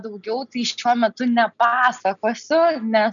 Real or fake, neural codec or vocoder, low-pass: real; none; 7.2 kHz